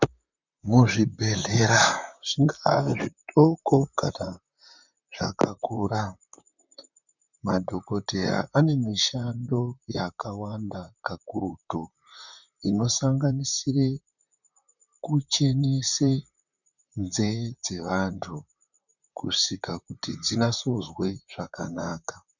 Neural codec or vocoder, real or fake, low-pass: vocoder, 44.1 kHz, 128 mel bands, Pupu-Vocoder; fake; 7.2 kHz